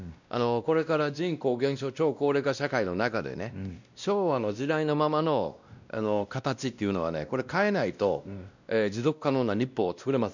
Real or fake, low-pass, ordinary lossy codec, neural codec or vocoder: fake; 7.2 kHz; none; codec, 16 kHz, 1 kbps, X-Codec, WavLM features, trained on Multilingual LibriSpeech